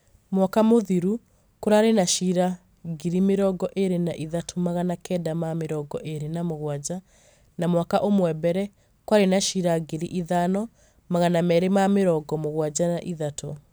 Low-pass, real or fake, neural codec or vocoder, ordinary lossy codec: none; real; none; none